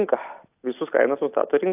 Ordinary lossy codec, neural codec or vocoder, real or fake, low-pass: AAC, 32 kbps; none; real; 3.6 kHz